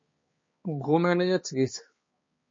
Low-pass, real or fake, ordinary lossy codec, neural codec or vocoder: 7.2 kHz; fake; MP3, 32 kbps; codec, 16 kHz, 2 kbps, X-Codec, HuBERT features, trained on balanced general audio